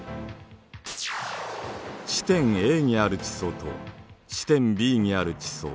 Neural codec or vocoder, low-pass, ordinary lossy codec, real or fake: none; none; none; real